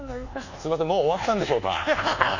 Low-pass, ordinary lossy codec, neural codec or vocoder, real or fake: 7.2 kHz; none; codec, 24 kHz, 1.2 kbps, DualCodec; fake